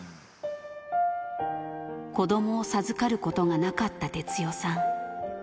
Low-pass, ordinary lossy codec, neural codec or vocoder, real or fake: none; none; none; real